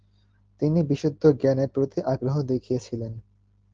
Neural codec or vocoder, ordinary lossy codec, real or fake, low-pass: none; Opus, 16 kbps; real; 7.2 kHz